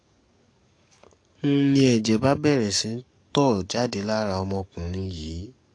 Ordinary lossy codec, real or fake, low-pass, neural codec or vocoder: AAC, 48 kbps; fake; 9.9 kHz; codec, 44.1 kHz, 7.8 kbps, DAC